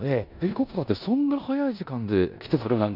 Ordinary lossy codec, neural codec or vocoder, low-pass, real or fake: none; codec, 16 kHz in and 24 kHz out, 0.9 kbps, LongCat-Audio-Codec, four codebook decoder; 5.4 kHz; fake